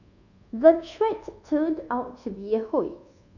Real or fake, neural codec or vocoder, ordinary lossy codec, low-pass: fake; codec, 24 kHz, 1.2 kbps, DualCodec; none; 7.2 kHz